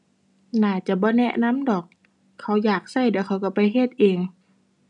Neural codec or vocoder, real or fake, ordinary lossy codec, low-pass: none; real; none; 10.8 kHz